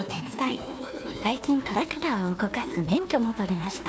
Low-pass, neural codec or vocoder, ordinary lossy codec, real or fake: none; codec, 16 kHz, 1 kbps, FunCodec, trained on Chinese and English, 50 frames a second; none; fake